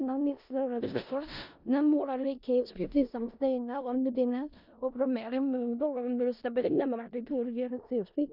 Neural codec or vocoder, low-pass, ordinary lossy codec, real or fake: codec, 16 kHz in and 24 kHz out, 0.4 kbps, LongCat-Audio-Codec, four codebook decoder; 5.4 kHz; none; fake